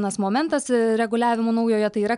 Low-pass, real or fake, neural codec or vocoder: 10.8 kHz; real; none